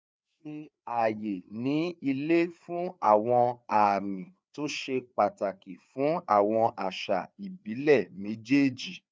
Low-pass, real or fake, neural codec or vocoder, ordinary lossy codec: none; fake; codec, 16 kHz, 4 kbps, FreqCodec, larger model; none